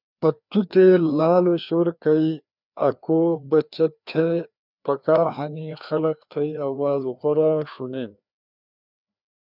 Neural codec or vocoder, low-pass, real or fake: codec, 16 kHz, 2 kbps, FreqCodec, larger model; 5.4 kHz; fake